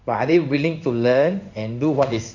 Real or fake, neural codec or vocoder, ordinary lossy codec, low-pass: fake; codec, 16 kHz in and 24 kHz out, 1 kbps, XY-Tokenizer; none; 7.2 kHz